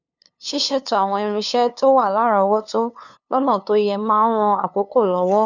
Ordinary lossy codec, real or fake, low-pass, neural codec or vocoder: none; fake; 7.2 kHz; codec, 16 kHz, 2 kbps, FunCodec, trained on LibriTTS, 25 frames a second